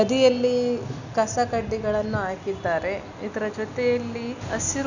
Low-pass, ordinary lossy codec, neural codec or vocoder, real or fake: 7.2 kHz; none; none; real